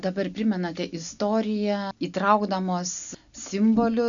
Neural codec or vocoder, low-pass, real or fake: none; 7.2 kHz; real